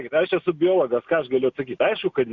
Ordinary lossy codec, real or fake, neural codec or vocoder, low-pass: Opus, 64 kbps; real; none; 7.2 kHz